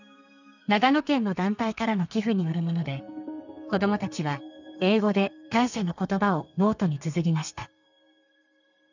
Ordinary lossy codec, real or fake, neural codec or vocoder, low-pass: none; fake; codec, 32 kHz, 1.9 kbps, SNAC; 7.2 kHz